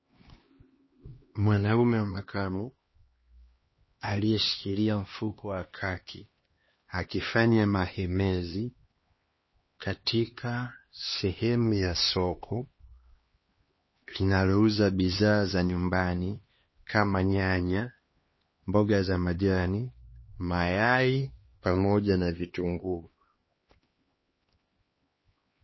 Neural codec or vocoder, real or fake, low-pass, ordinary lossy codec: codec, 16 kHz, 2 kbps, X-Codec, HuBERT features, trained on LibriSpeech; fake; 7.2 kHz; MP3, 24 kbps